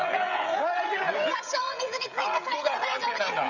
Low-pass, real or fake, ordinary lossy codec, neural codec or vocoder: 7.2 kHz; fake; none; codec, 16 kHz, 16 kbps, FreqCodec, smaller model